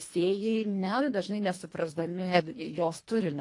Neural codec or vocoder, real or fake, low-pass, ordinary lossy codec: codec, 24 kHz, 1.5 kbps, HILCodec; fake; 10.8 kHz; AAC, 48 kbps